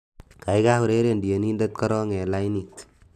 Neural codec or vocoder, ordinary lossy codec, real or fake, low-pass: none; AAC, 96 kbps; real; 14.4 kHz